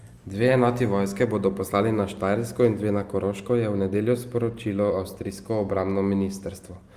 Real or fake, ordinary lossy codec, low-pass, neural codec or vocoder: real; Opus, 32 kbps; 14.4 kHz; none